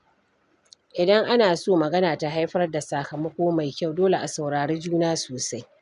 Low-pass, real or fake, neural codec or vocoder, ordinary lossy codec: 10.8 kHz; real; none; none